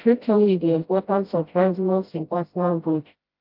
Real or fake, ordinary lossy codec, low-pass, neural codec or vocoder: fake; Opus, 24 kbps; 5.4 kHz; codec, 16 kHz, 0.5 kbps, FreqCodec, smaller model